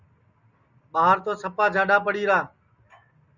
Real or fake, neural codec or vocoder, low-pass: real; none; 7.2 kHz